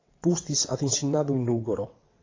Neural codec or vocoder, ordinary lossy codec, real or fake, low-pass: vocoder, 22.05 kHz, 80 mel bands, WaveNeXt; AAC, 32 kbps; fake; 7.2 kHz